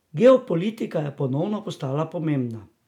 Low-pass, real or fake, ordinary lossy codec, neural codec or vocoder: 19.8 kHz; real; none; none